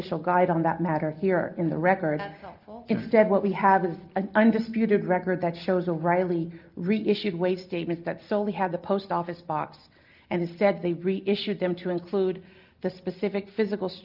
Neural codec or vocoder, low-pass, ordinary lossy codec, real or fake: none; 5.4 kHz; Opus, 32 kbps; real